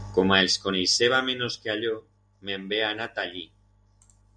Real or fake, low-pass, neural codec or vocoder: real; 9.9 kHz; none